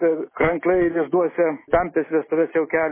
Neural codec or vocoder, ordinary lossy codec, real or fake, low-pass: none; MP3, 16 kbps; real; 3.6 kHz